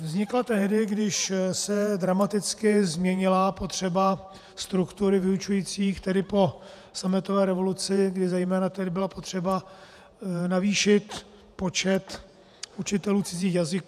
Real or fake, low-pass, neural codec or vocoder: fake; 14.4 kHz; vocoder, 48 kHz, 128 mel bands, Vocos